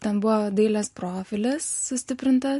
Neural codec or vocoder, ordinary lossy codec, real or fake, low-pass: none; MP3, 48 kbps; real; 14.4 kHz